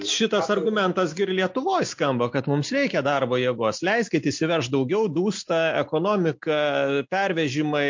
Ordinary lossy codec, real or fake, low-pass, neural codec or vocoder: MP3, 48 kbps; real; 7.2 kHz; none